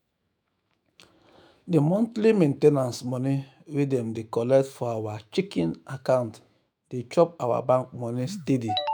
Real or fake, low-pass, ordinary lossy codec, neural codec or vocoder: fake; none; none; autoencoder, 48 kHz, 128 numbers a frame, DAC-VAE, trained on Japanese speech